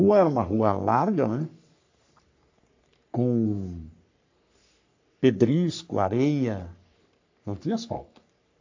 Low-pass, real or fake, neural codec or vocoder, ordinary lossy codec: 7.2 kHz; fake; codec, 44.1 kHz, 3.4 kbps, Pupu-Codec; AAC, 48 kbps